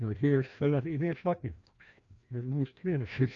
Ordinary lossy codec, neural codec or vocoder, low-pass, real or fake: Opus, 64 kbps; codec, 16 kHz, 1 kbps, FreqCodec, larger model; 7.2 kHz; fake